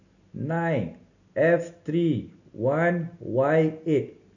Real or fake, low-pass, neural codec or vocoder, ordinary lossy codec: real; 7.2 kHz; none; none